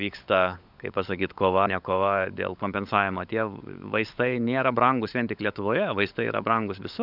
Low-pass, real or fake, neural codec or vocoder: 5.4 kHz; fake; codec, 16 kHz, 16 kbps, FunCodec, trained on LibriTTS, 50 frames a second